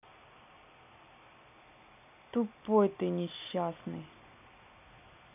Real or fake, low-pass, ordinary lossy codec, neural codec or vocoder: real; 3.6 kHz; none; none